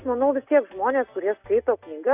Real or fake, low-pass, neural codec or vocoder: real; 3.6 kHz; none